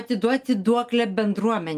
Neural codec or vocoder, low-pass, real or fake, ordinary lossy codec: none; 14.4 kHz; real; Opus, 32 kbps